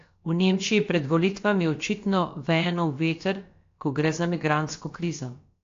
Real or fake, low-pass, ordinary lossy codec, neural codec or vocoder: fake; 7.2 kHz; AAC, 48 kbps; codec, 16 kHz, about 1 kbps, DyCAST, with the encoder's durations